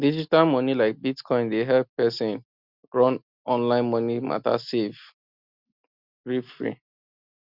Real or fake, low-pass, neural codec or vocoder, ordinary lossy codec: real; 5.4 kHz; none; Opus, 64 kbps